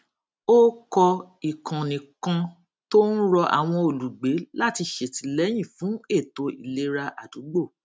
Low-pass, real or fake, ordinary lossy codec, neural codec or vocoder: none; real; none; none